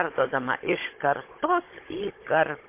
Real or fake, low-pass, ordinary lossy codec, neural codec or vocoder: fake; 3.6 kHz; MP3, 24 kbps; codec, 16 kHz, 16 kbps, FunCodec, trained on Chinese and English, 50 frames a second